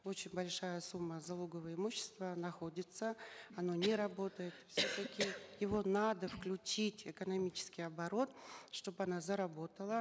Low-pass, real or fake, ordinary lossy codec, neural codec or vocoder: none; real; none; none